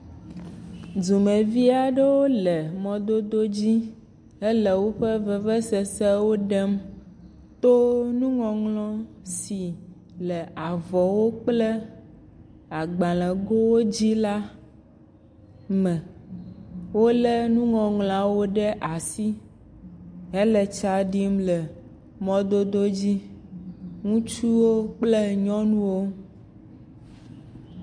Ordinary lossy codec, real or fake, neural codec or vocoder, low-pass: MP3, 64 kbps; real; none; 9.9 kHz